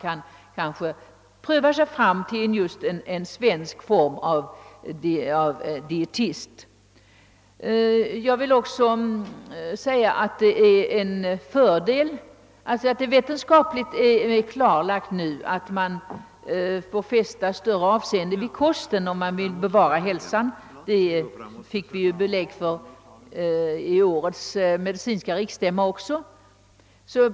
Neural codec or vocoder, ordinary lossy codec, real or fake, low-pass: none; none; real; none